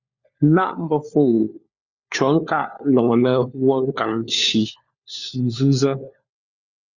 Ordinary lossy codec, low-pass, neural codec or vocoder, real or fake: Opus, 64 kbps; 7.2 kHz; codec, 16 kHz, 4 kbps, FunCodec, trained on LibriTTS, 50 frames a second; fake